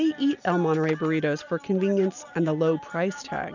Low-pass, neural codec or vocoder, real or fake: 7.2 kHz; none; real